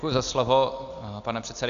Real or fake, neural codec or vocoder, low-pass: real; none; 7.2 kHz